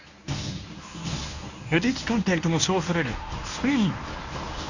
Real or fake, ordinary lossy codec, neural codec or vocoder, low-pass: fake; none; codec, 24 kHz, 0.9 kbps, WavTokenizer, medium speech release version 1; 7.2 kHz